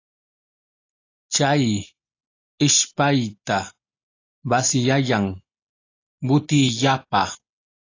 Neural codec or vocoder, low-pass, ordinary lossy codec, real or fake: none; 7.2 kHz; AAC, 32 kbps; real